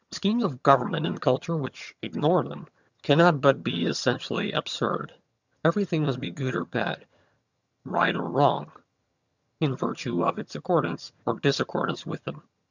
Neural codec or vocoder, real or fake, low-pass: vocoder, 22.05 kHz, 80 mel bands, HiFi-GAN; fake; 7.2 kHz